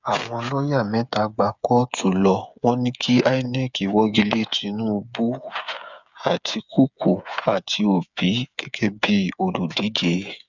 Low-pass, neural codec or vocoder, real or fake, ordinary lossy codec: 7.2 kHz; codec, 16 kHz, 8 kbps, FreqCodec, smaller model; fake; none